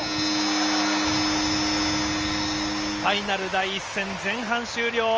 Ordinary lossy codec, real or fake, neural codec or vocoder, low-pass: Opus, 24 kbps; real; none; 7.2 kHz